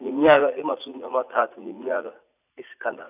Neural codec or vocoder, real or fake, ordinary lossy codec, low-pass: vocoder, 44.1 kHz, 80 mel bands, Vocos; fake; none; 3.6 kHz